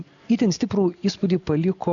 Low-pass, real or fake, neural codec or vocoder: 7.2 kHz; real; none